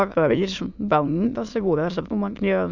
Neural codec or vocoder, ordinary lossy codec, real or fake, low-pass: autoencoder, 22.05 kHz, a latent of 192 numbers a frame, VITS, trained on many speakers; none; fake; 7.2 kHz